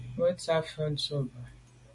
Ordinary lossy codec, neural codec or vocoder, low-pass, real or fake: MP3, 48 kbps; none; 10.8 kHz; real